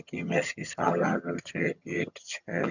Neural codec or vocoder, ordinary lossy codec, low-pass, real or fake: vocoder, 22.05 kHz, 80 mel bands, HiFi-GAN; none; 7.2 kHz; fake